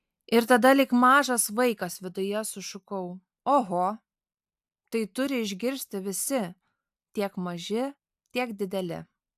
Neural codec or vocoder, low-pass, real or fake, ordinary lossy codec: none; 14.4 kHz; real; AAC, 96 kbps